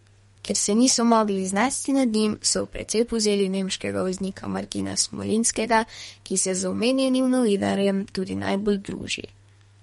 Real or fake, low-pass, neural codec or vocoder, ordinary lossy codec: fake; 14.4 kHz; codec, 32 kHz, 1.9 kbps, SNAC; MP3, 48 kbps